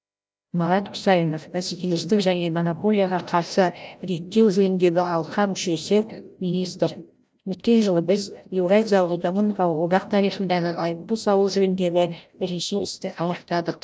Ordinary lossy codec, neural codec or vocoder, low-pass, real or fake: none; codec, 16 kHz, 0.5 kbps, FreqCodec, larger model; none; fake